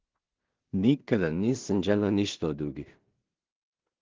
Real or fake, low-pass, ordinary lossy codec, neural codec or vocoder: fake; 7.2 kHz; Opus, 16 kbps; codec, 16 kHz in and 24 kHz out, 0.4 kbps, LongCat-Audio-Codec, two codebook decoder